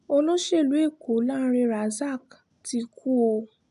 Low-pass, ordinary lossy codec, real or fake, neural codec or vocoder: 10.8 kHz; AAC, 96 kbps; real; none